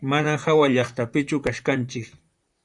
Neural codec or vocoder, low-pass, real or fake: vocoder, 44.1 kHz, 128 mel bands, Pupu-Vocoder; 10.8 kHz; fake